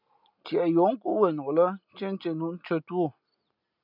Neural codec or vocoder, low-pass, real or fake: none; 5.4 kHz; real